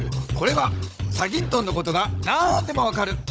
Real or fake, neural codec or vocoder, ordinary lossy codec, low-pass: fake; codec, 16 kHz, 16 kbps, FunCodec, trained on Chinese and English, 50 frames a second; none; none